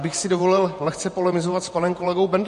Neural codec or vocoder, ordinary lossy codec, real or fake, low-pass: vocoder, 48 kHz, 128 mel bands, Vocos; MP3, 48 kbps; fake; 14.4 kHz